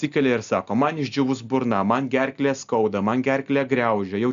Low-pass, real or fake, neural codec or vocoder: 7.2 kHz; real; none